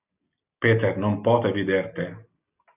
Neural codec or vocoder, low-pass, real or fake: none; 3.6 kHz; real